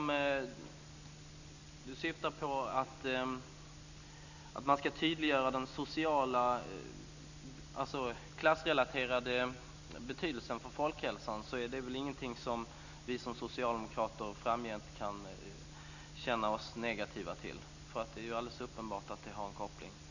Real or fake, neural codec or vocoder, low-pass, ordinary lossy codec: real; none; 7.2 kHz; none